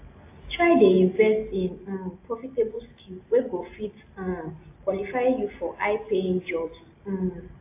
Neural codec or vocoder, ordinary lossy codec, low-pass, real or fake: none; AAC, 24 kbps; 3.6 kHz; real